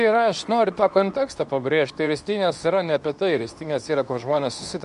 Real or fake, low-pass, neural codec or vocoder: fake; 10.8 kHz; codec, 24 kHz, 0.9 kbps, WavTokenizer, medium speech release version 2